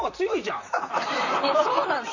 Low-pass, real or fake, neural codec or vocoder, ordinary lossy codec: 7.2 kHz; fake; vocoder, 44.1 kHz, 128 mel bands, Pupu-Vocoder; none